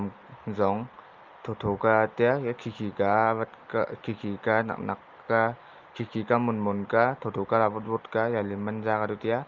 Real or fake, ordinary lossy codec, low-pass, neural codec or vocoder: real; Opus, 32 kbps; 7.2 kHz; none